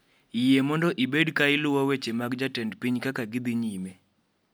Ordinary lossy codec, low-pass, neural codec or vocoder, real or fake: none; none; none; real